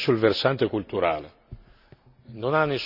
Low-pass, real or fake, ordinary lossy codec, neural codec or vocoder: 5.4 kHz; real; none; none